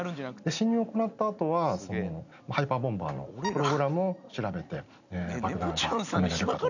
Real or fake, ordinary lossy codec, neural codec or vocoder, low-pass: real; none; none; 7.2 kHz